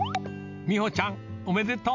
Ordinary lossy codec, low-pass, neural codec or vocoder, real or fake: none; 7.2 kHz; none; real